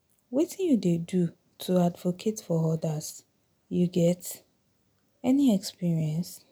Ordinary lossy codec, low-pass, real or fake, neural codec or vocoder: none; none; real; none